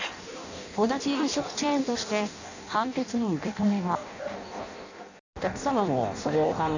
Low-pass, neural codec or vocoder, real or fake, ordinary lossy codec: 7.2 kHz; codec, 16 kHz in and 24 kHz out, 0.6 kbps, FireRedTTS-2 codec; fake; none